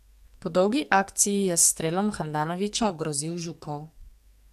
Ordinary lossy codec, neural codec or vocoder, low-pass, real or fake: AAC, 96 kbps; codec, 44.1 kHz, 2.6 kbps, SNAC; 14.4 kHz; fake